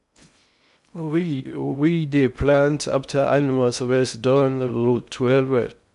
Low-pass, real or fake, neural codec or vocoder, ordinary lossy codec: 10.8 kHz; fake; codec, 16 kHz in and 24 kHz out, 0.6 kbps, FocalCodec, streaming, 2048 codes; none